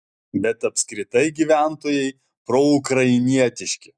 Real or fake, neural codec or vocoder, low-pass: real; none; 9.9 kHz